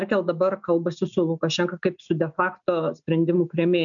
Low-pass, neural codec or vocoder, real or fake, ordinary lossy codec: 7.2 kHz; none; real; MP3, 96 kbps